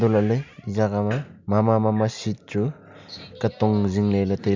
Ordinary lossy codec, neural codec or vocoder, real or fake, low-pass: MP3, 64 kbps; none; real; 7.2 kHz